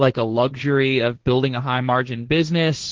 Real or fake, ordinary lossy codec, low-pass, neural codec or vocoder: fake; Opus, 16 kbps; 7.2 kHz; codec, 16 kHz, 1.1 kbps, Voila-Tokenizer